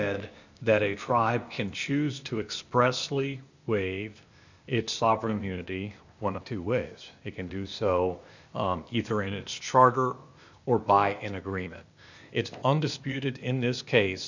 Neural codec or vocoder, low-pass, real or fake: codec, 16 kHz, 0.8 kbps, ZipCodec; 7.2 kHz; fake